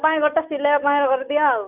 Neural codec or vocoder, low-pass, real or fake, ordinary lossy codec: none; 3.6 kHz; real; none